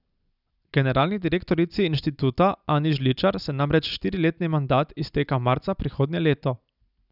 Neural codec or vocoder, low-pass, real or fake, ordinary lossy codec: none; 5.4 kHz; real; none